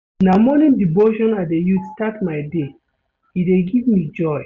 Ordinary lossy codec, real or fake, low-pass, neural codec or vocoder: Opus, 64 kbps; real; 7.2 kHz; none